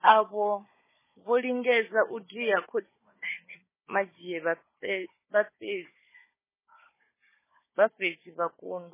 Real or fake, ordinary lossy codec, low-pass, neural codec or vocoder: fake; MP3, 16 kbps; 3.6 kHz; codec, 16 kHz, 4 kbps, FunCodec, trained on Chinese and English, 50 frames a second